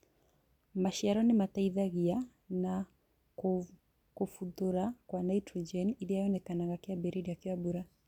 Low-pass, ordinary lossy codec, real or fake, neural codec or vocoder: 19.8 kHz; none; real; none